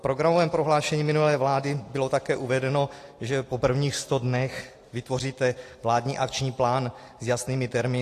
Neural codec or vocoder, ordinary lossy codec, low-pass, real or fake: none; AAC, 48 kbps; 14.4 kHz; real